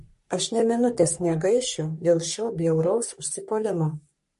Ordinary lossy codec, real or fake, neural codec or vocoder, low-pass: MP3, 48 kbps; fake; codec, 44.1 kHz, 3.4 kbps, Pupu-Codec; 14.4 kHz